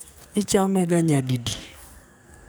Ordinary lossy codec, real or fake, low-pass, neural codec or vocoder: none; fake; none; codec, 44.1 kHz, 2.6 kbps, SNAC